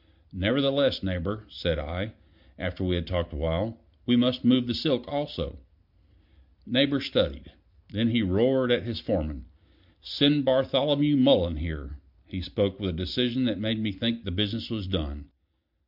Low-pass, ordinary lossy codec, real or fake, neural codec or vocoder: 5.4 kHz; MP3, 48 kbps; real; none